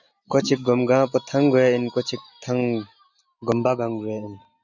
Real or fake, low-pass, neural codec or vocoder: real; 7.2 kHz; none